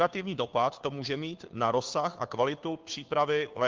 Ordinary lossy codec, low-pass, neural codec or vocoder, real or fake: Opus, 24 kbps; 7.2 kHz; codec, 16 kHz, 2 kbps, FunCodec, trained on Chinese and English, 25 frames a second; fake